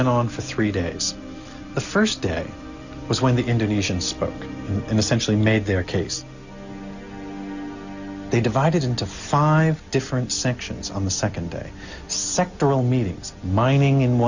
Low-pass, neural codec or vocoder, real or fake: 7.2 kHz; none; real